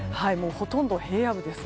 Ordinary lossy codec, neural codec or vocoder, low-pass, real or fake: none; none; none; real